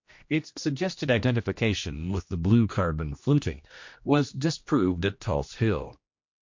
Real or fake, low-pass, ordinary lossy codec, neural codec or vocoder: fake; 7.2 kHz; MP3, 48 kbps; codec, 16 kHz, 1 kbps, X-Codec, HuBERT features, trained on general audio